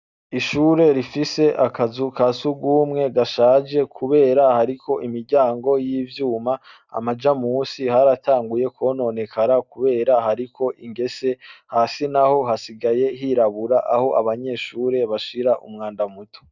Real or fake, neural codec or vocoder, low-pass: real; none; 7.2 kHz